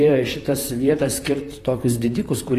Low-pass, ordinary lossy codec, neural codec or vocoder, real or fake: 14.4 kHz; AAC, 48 kbps; vocoder, 44.1 kHz, 128 mel bands, Pupu-Vocoder; fake